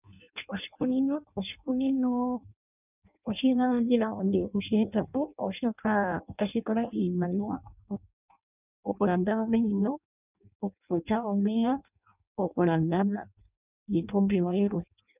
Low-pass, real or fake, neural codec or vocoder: 3.6 kHz; fake; codec, 16 kHz in and 24 kHz out, 0.6 kbps, FireRedTTS-2 codec